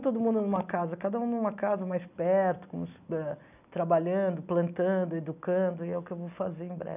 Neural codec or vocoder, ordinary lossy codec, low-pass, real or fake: none; none; 3.6 kHz; real